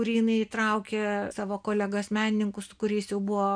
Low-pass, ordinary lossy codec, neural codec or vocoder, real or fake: 9.9 kHz; MP3, 96 kbps; none; real